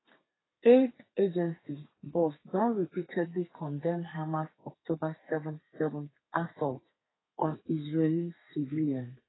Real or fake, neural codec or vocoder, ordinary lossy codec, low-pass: fake; codec, 32 kHz, 1.9 kbps, SNAC; AAC, 16 kbps; 7.2 kHz